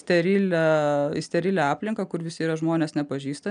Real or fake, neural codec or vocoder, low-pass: real; none; 9.9 kHz